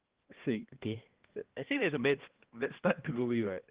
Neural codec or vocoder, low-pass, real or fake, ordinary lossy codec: codec, 16 kHz, 1 kbps, X-Codec, HuBERT features, trained on balanced general audio; 3.6 kHz; fake; Opus, 16 kbps